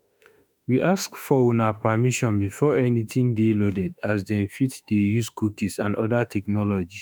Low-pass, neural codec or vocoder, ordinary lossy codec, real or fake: none; autoencoder, 48 kHz, 32 numbers a frame, DAC-VAE, trained on Japanese speech; none; fake